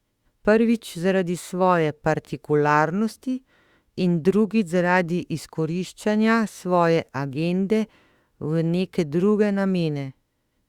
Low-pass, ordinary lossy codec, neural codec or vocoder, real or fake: 19.8 kHz; Opus, 64 kbps; autoencoder, 48 kHz, 32 numbers a frame, DAC-VAE, trained on Japanese speech; fake